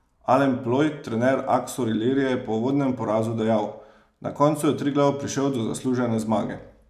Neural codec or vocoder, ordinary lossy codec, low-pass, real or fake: none; none; 14.4 kHz; real